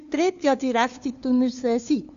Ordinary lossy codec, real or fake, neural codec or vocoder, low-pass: none; fake; codec, 16 kHz, 2 kbps, FunCodec, trained on LibriTTS, 25 frames a second; 7.2 kHz